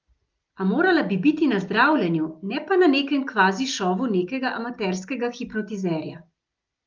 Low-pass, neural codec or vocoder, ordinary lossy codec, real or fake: 7.2 kHz; none; Opus, 32 kbps; real